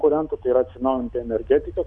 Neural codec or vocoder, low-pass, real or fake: none; 10.8 kHz; real